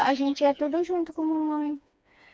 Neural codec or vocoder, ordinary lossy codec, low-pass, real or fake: codec, 16 kHz, 2 kbps, FreqCodec, smaller model; none; none; fake